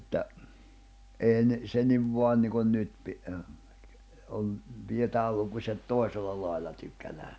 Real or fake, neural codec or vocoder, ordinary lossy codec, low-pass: real; none; none; none